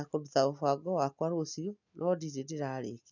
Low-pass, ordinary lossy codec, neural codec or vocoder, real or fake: 7.2 kHz; none; none; real